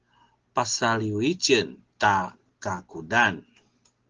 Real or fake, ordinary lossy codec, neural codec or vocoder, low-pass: real; Opus, 16 kbps; none; 7.2 kHz